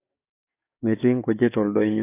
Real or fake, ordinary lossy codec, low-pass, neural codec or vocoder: fake; AAC, 24 kbps; 3.6 kHz; codec, 44.1 kHz, 7.8 kbps, DAC